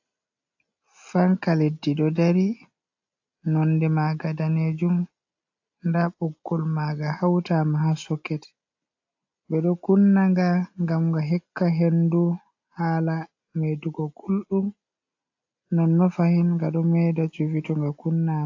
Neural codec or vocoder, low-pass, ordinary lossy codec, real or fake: none; 7.2 kHz; AAC, 48 kbps; real